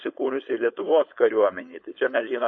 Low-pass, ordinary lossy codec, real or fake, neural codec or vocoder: 7.2 kHz; MP3, 32 kbps; fake; codec, 16 kHz, 4.8 kbps, FACodec